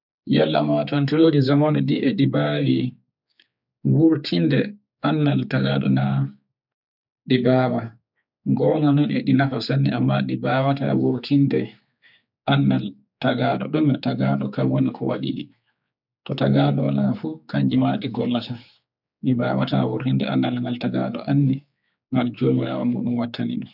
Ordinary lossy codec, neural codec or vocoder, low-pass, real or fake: none; codec, 16 kHz, 4 kbps, X-Codec, HuBERT features, trained on general audio; 5.4 kHz; fake